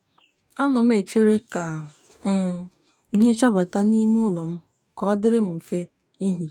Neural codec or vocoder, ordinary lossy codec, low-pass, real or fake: codec, 44.1 kHz, 2.6 kbps, DAC; none; 19.8 kHz; fake